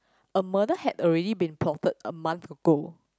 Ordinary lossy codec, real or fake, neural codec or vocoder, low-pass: none; real; none; none